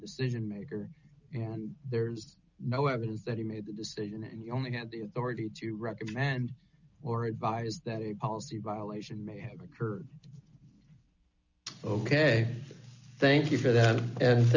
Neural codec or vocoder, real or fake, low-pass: none; real; 7.2 kHz